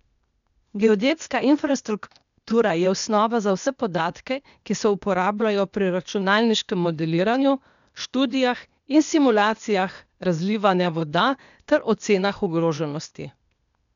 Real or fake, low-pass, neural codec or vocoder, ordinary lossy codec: fake; 7.2 kHz; codec, 16 kHz, 0.8 kbps, ZipCodec; none